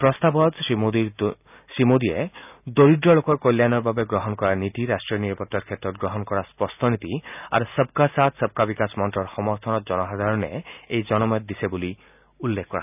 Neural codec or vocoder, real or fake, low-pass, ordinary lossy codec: none; real; 3.6 kHz; none